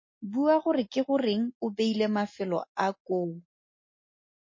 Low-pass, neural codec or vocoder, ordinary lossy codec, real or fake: 7.2 kHz; none; MP3, 32 kbps; real